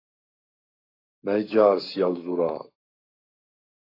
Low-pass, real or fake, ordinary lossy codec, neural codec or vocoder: 5.4 kHz; fake; AAC, 32 kbps; codec, 44.1 kHz, 7.8 kbps, Pupu-Codec